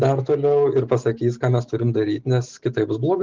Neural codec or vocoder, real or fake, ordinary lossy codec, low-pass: none; real; Opus, 16 kbps; 7.2 kHz